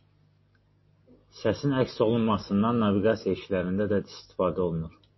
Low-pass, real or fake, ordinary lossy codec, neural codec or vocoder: 7.2 kHz; real; MP3, 24 kbps; none